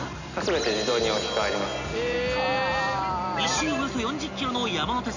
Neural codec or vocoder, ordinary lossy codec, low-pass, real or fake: none; none; 7.2 kHz; real